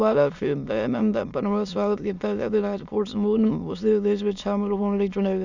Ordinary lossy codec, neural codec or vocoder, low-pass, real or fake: none; autoencoder, 22.05 kHz, a latent of 192 numbers a frame, VITS, trained on many speakers; 7.2 kHz; fake